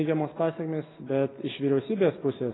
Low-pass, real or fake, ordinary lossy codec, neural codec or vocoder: 7.2 kHz; real; AAC, 16 kbps; none